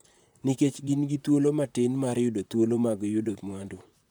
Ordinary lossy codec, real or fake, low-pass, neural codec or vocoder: none; fake; none; vocoder, 44.1 kHz, 128 mel bands, Pupu-Vocoder